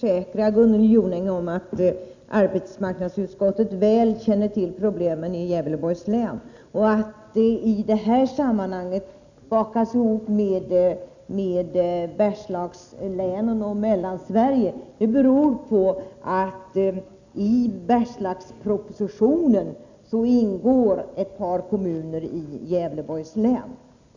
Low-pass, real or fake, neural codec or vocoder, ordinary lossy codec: 7.2 kHz; real; none; none